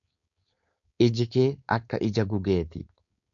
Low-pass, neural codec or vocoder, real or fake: 7.2 kHz; codec, 16 kHz, 4.8 kbps, FACodec; fake